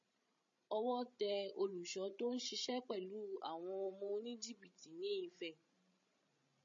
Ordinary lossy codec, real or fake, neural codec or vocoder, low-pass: MP3, 32 kbps; fake; codec, 16 kHz, 16 kbps, FreqCodec, larger model; 7.2 kHz